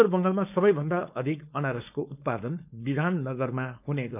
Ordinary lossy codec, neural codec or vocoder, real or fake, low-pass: none; codec, 16 kHz, 4.8 kbps, FACodec; fake; 3.6 kHz